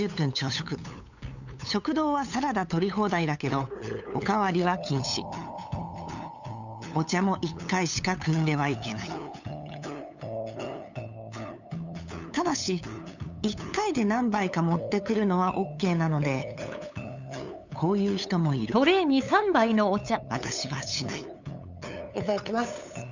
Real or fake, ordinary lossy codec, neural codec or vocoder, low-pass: fake; none; codec, 16 kHz, 8 kbps, FunCodec, trained on LibriTTS, 25 frames a second; 7.2 kHz